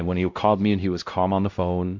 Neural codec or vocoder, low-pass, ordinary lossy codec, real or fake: codec, 16 kHz, 0.5 kbps, X-Codec, WavLM features, trained on Multilingual LibriSpeech; 7.2 kHz; MP3, 64 kbps; fake